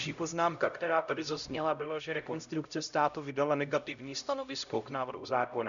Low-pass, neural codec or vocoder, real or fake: 7.2 kHz; codec, 16 kHz, 0.5 kbps, X-Codec, HuBERT features, trained on LibriSpeech; fake